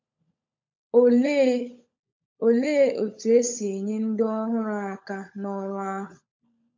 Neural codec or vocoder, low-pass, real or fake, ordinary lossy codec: codec, 16 kHz, 16 kbps, FunCodec, trained on LibriTTS, 50 frames a second; 7.2 kHz; fake; MP3, 48 kbps